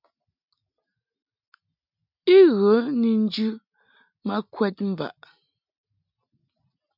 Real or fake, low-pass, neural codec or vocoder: real; 5.4 kHz; none